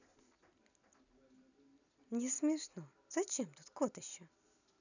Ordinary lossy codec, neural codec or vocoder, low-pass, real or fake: none; none; 7.2 kHz; real